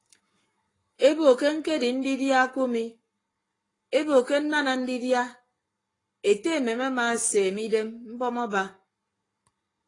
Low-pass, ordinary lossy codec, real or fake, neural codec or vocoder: 10.8 kHz; AAC, 32 kbps; fake; codec, 44.1 kHz, 7.8 kbps, Pupu-Codec